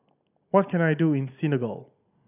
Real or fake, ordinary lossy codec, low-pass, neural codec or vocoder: real; none; 3.6 kHz; none